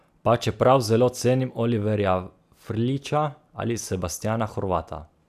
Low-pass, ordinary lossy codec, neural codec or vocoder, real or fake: 14.4 kHz; none; none; real